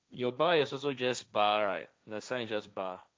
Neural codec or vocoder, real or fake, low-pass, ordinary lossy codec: codec, 16 kHz, 1.1 kbps, Voila-Tokenizer; fake; none; none